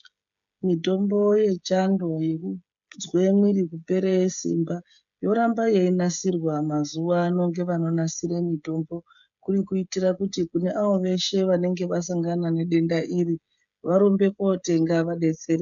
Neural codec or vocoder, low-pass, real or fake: codec, 16 kHz, 16 kbps, FreqCodec, smaller model; 7.2 kHz; fake